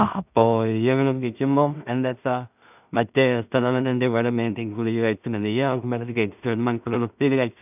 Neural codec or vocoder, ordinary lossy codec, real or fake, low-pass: codec, 16 kHz in and 24 kHz out, 0.4 kbps, LongCat-Audio-Codec, two codebook decoder; none; fake; 3.6 kHz